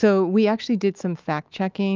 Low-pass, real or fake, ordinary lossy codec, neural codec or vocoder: 7.2 kHz; fake; Opus, 24 kbps; autoencoder, 48 kHz, 128 numbers a frame, DAC-VAE, trained on Japanese speech